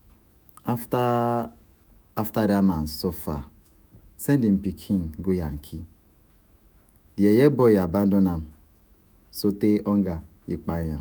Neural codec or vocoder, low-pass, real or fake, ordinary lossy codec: autoencoder, 48 kHz, 128 numbers a frame, DAC-VAE, trained on Japanese speech; none; fake; none